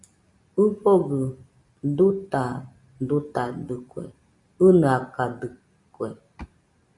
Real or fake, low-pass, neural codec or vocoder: real; 10.8 kHz; none